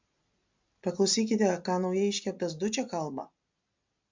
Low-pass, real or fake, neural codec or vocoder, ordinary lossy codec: 7.2 kHz; real; none; MP3, 64 kbps